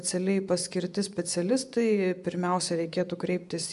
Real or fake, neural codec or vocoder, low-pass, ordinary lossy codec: fake; vocoder, 24 kHz, 100 mel bands, Vocos; 10.8 kHz; MP3, 96 kbps